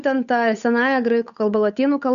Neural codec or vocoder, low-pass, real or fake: codec, 16 kHz, 8 kbps, FunCodec, trained on Chinese and English, 25 frames a second; 7.2 kHz; fake